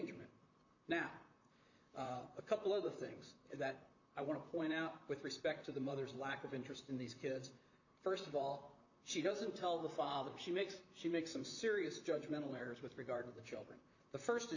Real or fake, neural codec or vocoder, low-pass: fake; vocoder, 44.1 kHz, 128 mel bands, Pupu-Vocoder; 7.2 kHz